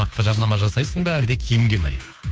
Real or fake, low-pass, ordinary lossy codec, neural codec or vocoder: fake; none; none; codec, 16 kHz, 2 kbps, FunCodec, trained on Chinese and English, 25 frames a second